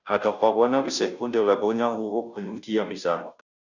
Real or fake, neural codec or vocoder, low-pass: fake; codec, 16 kHz, 0.5 kbps, FunCodec, trained on Chinese and English, 25 frames a second; 7.2 kHz